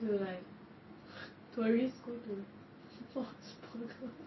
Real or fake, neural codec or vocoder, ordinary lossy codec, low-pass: real; none; MP3, 24 kbps; 7.2 kHz